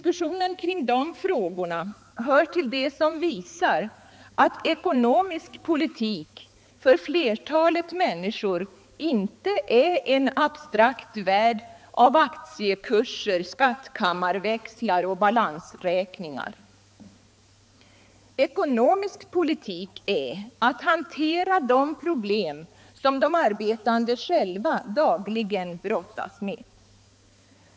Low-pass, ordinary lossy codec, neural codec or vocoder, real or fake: none; none; codec, 16 kHz, 4 kbps, X-Codec, HuBERT features, trained on balanced general audio; fake